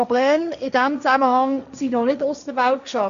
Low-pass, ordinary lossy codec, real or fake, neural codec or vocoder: 7.2 kHz; none; fake; codec, 16 kHz, 1.1 kbps, Voila-Tokenizer